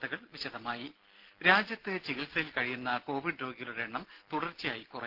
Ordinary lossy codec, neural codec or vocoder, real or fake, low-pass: Opus, 16 kbps; none; real; 5.4 kHz